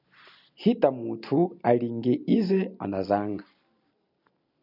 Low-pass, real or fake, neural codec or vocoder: 5.4 kHz; real; none